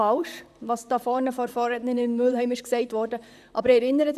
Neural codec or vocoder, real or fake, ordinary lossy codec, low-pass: vocoder, 44.1 kHz, 128 mel bands, Pupu-Vocoder; fake; none; 14.4 kHz